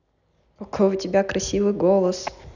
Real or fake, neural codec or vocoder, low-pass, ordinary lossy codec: real; none; 7.2 kHz; none